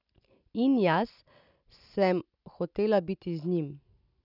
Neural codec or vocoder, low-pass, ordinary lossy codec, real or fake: none; 5.4 kHz; none; real